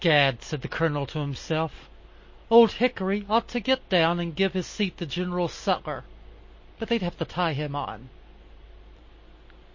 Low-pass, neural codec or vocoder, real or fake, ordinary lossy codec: 7.2 kHz; none; real; MP3, 32 kbps